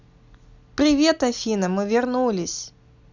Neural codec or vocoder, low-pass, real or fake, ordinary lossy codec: none; 7.2 kHz; real; Opus, 64 kbps